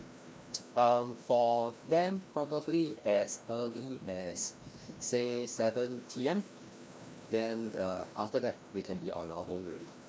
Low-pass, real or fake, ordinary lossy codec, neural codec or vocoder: none; fake; none; codec, 16 kHz, 1 kbps, FreqCodec, larger model